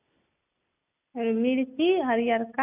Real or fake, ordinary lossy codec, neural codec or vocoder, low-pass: real; none; none; 3.6 kHz